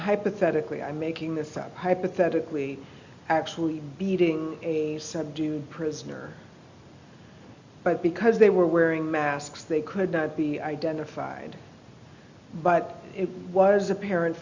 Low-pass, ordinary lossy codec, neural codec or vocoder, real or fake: 7.2 kHz; Opus, 64 kbps; none; real